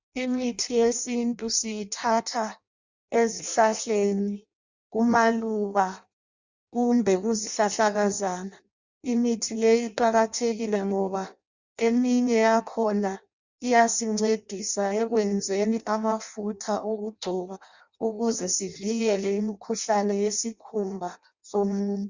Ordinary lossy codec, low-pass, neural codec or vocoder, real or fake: Opus, 64 kbps; 7.2 kHz; codec, 16 kHz in and 24 kHz out, 0.6 kbps, FireRedTTS-2 codec; fake